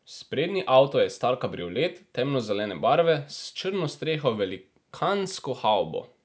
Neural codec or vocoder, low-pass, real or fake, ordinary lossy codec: none; none; real; none